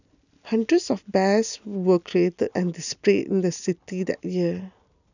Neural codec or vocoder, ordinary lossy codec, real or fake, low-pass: none; none; real; 7.2 kHz